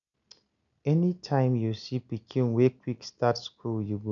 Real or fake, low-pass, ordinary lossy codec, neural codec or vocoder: real; 7.2 kHz; none; none